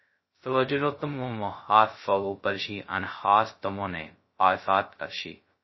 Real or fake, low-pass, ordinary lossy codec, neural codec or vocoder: fake; 7.2 kHz; MP3, 24 kbps; codec, 16 kHz, 0.2 kbps, FocalCodec